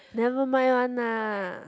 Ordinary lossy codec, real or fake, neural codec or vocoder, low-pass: none; real; none; none